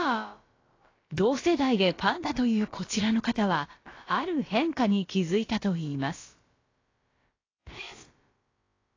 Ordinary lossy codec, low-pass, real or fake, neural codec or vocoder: AAC, 32 kbps; 7.2 kHz; fake; codec, 16 kHz, about 1 kbps, DyCAST, with the encoder's durations